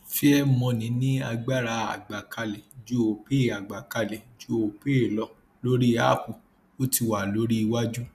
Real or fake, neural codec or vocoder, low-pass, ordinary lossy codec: real; none; 14.4 kHz; none